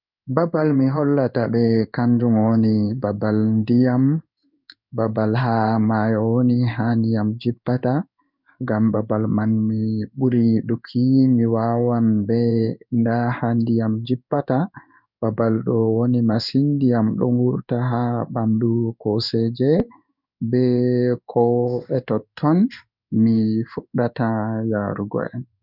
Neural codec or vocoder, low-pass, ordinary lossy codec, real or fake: codec, 16 kHz in and 24 kHz out, 1 kbps, XY-Tokenizer; 5.4 kHz; none; fake